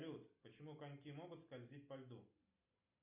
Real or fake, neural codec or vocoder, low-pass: real; none; 3.6 kHz